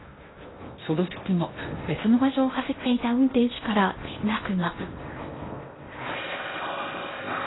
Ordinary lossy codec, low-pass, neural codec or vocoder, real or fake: AAC, 16 kbps; 7.2 kHz; codec, 16 kHz in and 24 kHz out, 0.6 kbps, FocalCodec, streaming, 2048 codes; fake